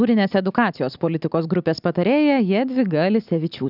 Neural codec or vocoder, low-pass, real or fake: none; 5.4 kHz; real